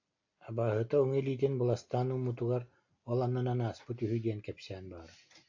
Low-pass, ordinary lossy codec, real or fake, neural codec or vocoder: 7.2 kHz; AAC, 48 kbps; real; none